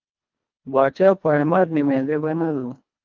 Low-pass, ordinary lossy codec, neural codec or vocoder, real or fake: 7.2 kHz; Opus, 24 kbps; codec, 24 kHz, 1.5 kbps, HILCodec; fake